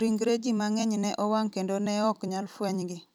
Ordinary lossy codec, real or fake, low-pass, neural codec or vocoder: none; fake; 19.8 kHz; vocoder, 44.1 kHz, 128 mel bands every 256 samples, BigVGAN v2